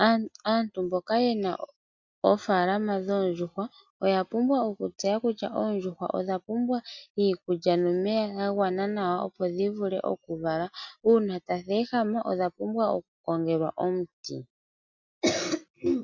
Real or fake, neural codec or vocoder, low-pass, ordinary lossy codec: real; none; 7.2 kHz; MP3, 64 kbps